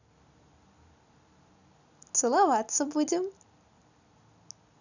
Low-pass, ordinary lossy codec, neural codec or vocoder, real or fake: 7.2 kHz; none; none; real